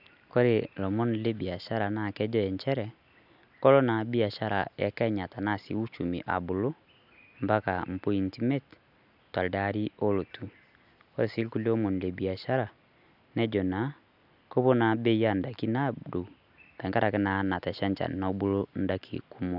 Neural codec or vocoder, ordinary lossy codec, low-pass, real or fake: none; none; 5.4 kHz; real